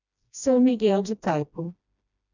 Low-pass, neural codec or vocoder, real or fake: 7.2 kHz; codec, 16 kHz, 1 kbps, FreqCodec, smaller model; fake